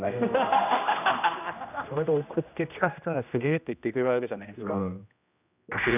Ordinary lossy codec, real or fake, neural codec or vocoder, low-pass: none; fake; codec, 16 kHz, 1 kbps, X-Codec, HuBERT features, trained on general audio; 3.6 kHz